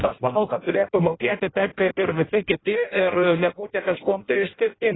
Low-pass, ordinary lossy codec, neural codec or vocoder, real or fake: 7.2 kHz; AAC, 16 kbps; codec, 16 kHz in and 24 kHz out, 0.6 kbps, FireRedTTS-2 codec; fake